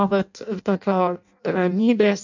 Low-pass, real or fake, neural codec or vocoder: 7.2 kHz; fake; codec, 16 kHz in and 24 kHz out, 0.6 kbps, FireRedTTS-2 codec